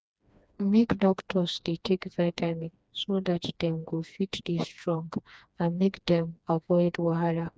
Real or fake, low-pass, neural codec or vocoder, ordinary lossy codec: fake; none; codec, 16 kHz, 2 kbps, FreqCodec, smaller model; none